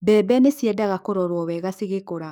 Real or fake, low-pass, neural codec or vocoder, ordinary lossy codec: fake; none; codec, 44.1 kHz, 7.8 kbps, DAC; none